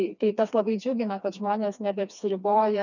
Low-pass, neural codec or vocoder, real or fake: 7.2 kHz; codec, 16 kHz, 2 kbps, FreqCodec, smaller model; fake